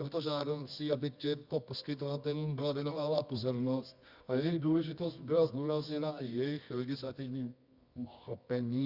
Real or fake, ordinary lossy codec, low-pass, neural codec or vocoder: fake; Opus, 64 kbps; 5.4 kHz; codec, 24 kHz, 0.9 kbps, WavTokenizer, medium music audio release